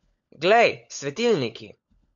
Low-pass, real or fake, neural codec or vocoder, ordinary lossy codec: 7.2 kHz; fake; codec, 16 kHz, 16 kbps, FunCodec, trained on LibriTTS, 50 frames a second; AAC, 64 kbps